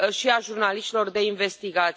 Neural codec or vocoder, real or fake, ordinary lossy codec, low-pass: none; real; none; none